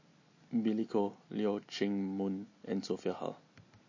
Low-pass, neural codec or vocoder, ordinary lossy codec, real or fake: 7.2 kHz; none; MP3, 32 kbps; real